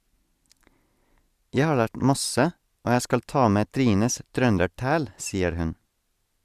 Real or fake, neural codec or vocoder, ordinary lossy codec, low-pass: real; none; Opus, 64 kbps; 14.4 kHz